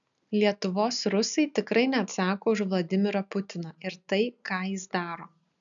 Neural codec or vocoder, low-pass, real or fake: none; 7.2 kHz; real